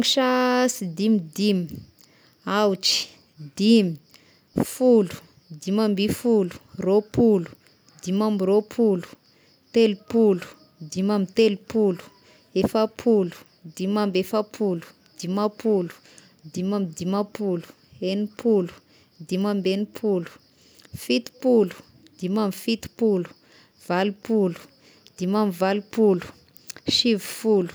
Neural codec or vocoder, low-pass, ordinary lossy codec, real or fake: none; none; none; real